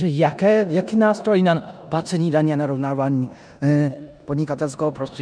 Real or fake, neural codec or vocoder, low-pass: fake; codec, 16 kHz in and 24 kHz out, 0.9 kbps, LongCat-Audio-Codec, four codebook decoder; 9.9 kHz